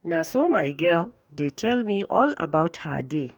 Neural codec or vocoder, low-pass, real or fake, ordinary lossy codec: codec, 44.1 kHz, 2.6 kbps, DAC; 19.8 kHz; fake; none